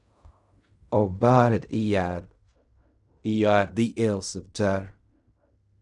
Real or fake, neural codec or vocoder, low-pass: fake; codec, 16 kHz in and 24 kHz out, 0.4 kbps, LongCat-Audio-Codec, fine tuned four codebook decoder; 10.8 kHz